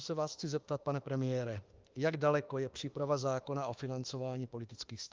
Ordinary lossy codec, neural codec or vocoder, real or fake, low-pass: Opus, 32 kbps; autoencoder, 48 kHz, 32 numbers a frame, DAC-VAE, trained on Japanese speech; fake; 7.2 kHz